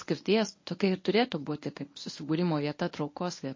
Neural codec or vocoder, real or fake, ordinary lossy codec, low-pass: codec, 24 kHz, 0.9 kbps, WavTokenizer, medium speech release version 1; fake; MP3, 32 kbps; 7.2 kHz